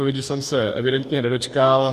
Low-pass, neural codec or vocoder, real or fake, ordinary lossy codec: 14.4 kHz; codec, 44.1 kHz, 2.6 kbps, DAC; fake; Opus, 64 kbps